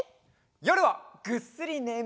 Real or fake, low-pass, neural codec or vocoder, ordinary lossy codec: real; none; none; none